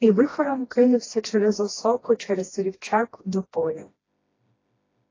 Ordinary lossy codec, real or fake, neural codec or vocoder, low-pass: AAC, 32 kbps; fake; codec, 16 kHz, 1 kbps, FreqCodec, smaller model; 7.2 kHz